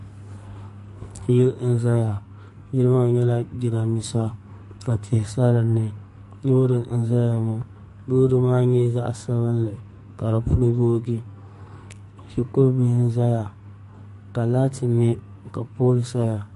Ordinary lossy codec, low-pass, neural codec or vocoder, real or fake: MP3, 48 kbps; 14.4 kHz; codec, 44.1 kHz, 2.6 kbps, SNAC; fake